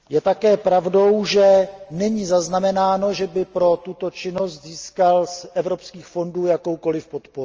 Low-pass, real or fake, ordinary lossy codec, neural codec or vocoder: 7.2 kHz; real; Opus, 24 kbps; none